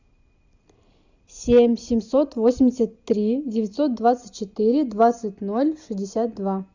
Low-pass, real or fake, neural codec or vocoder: 7.2 kHz; real; none